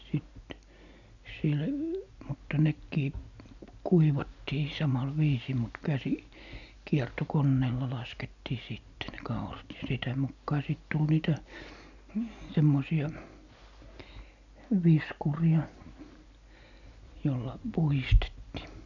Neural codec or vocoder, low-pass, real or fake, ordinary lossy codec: none; 7.2 kHz; real; none